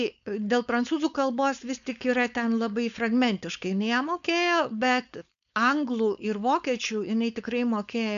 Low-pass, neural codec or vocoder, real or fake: 7.2 kHz; codec, 16 kHz, 4.8 kbps, FACodec; fake